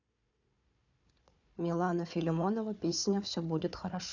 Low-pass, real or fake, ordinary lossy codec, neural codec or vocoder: 7.2 kHz; fake; none; codec, 16 kHz, 4 kbps, FunCodec, trained on Chinese and English, 50 frames a second